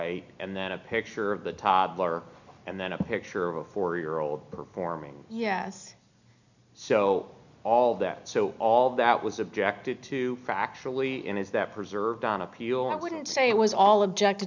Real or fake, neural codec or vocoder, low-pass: real; none; 7.2 kHz